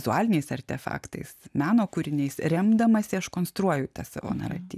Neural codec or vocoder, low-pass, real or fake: none; 14.4 kHz; real